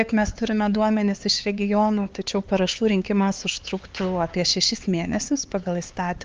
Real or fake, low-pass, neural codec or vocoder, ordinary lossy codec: fake; 7.2 kHz; codec, 16 kHz, 4 kbps, X-Codec, HuBERT features, trained on LibriSpeech; Opus, 24 kbps